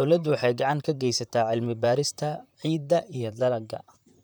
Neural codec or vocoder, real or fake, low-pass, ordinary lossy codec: vocoder, 44.1 kHz, 128 mel bands, Pupu-Vocoder; fake; none; none